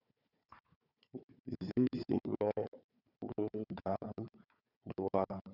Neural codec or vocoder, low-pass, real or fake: codec, 16 kHz, 4 kbps, FunCodec, trained on Chinese and English, 50 frames a second; 5.4 kHz; fake